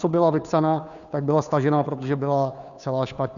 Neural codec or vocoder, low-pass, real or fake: codec, 16 kHz, 2 kbps, FunCodec, trained on Chinese and English, 25 frames a second; 7.2 kHz; fake